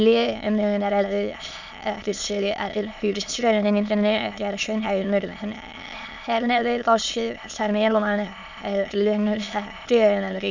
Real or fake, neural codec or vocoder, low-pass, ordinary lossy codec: fake; autoencoder, 22.05 kHz, a latent of 192 numbers a frame, VITS, trained on many speakers; 7.2 kHz; none